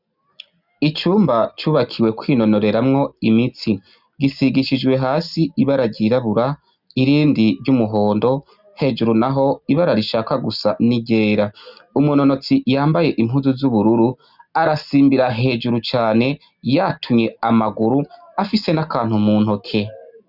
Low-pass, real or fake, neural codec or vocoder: 5.4 kHz; real; none